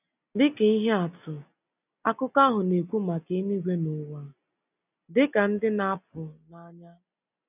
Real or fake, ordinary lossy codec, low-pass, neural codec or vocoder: real; none; 3.6 kHz; none